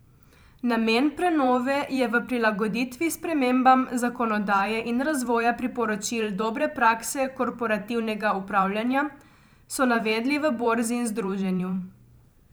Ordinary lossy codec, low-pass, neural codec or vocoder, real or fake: none; none; vocoder, 44.1 kHz, 128 mel bands every 512 samples, BigVGAN v2; fake